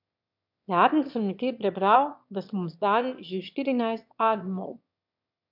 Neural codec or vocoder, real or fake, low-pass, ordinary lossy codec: autoencoder, 22.05 kHz, a latent of 192 numbers a frame, VITS, trained on one speaker; fake; 5.4 kHz; MP3, 48 kbps